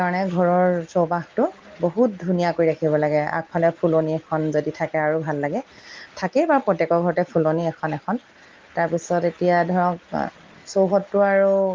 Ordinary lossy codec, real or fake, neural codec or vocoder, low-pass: Opus, 32 kbps; real; none; 7.2 kHz